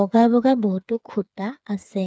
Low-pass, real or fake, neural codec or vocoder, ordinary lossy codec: none; fake; codec, 16 kHz, 4 kbps, FreqCodec, smaller model; none